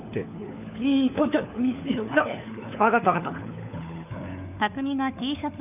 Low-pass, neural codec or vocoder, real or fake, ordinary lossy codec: 3.6 kHz; codec, 16 kHz, 4 kbps, FunCodec, trained on LibriTTS, 50 frames a second; fake; none